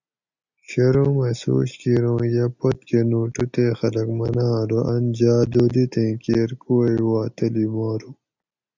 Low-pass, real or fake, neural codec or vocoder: 7.2 kHz; real; none